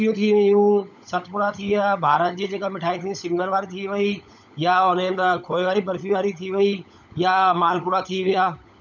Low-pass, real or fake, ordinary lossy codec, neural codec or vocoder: 7.2 kHz; fake; none; codec, 16 kHz, 16 kbps, FunCodec, trained on LibriTTS, 50 frames a second